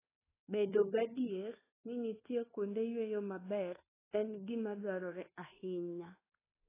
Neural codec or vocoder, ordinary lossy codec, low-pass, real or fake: codec, 24 kHz, 1.2 kbps, DualCodec; AAC, 16 kbps; 3.6 kHz; fake